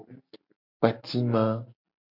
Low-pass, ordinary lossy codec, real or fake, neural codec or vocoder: 5.4 kHz; MP3, 48 kbps; real; none